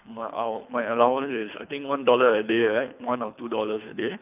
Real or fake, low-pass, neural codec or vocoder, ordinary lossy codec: fake; 3.6 kHz; codec, 24 kHz, 3 kbps, HILCodec; none